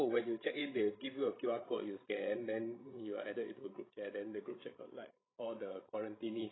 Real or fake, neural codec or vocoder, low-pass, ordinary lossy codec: fake; codec, 16 kHz, 16 kbps, FreqCodec, larger model; 7.2 kHz; AAC, 16 kbps